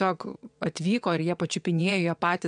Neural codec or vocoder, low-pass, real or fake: vocoder, 22.05 kHz, 80 mel bands, WaveNeXt; 9.9 kHz; fake